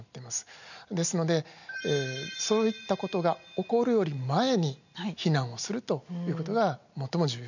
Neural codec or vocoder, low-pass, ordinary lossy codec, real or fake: none; 7.2 kHz; none; real